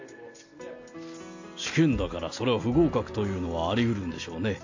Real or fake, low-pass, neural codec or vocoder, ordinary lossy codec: real; 7.2 kHz; none; AAC, 48 kbps